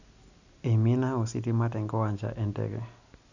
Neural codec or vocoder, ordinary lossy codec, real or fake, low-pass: none; none; real; 7.2 kHz